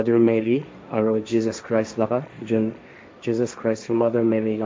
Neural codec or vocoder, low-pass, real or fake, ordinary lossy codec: codec, 16 kHz, 1.1 kbps, Voila-Tokenizer; none; fake; none